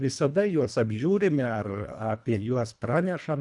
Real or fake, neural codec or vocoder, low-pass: fake; codec, 24 kHz, 1.5 kbps, HILCodec; 10.8 kHz